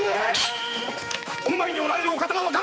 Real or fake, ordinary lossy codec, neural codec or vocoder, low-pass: fake; none; codec, 16 kHz, 4 kbps, X-Codec, HuBERT features, trained on balanced general audio; none